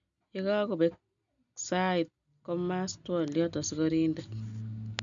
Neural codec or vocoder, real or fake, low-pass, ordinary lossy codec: none; real; 7.2 kHz; none